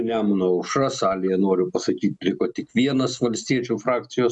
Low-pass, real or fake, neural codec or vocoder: 10.8 kHz; real; none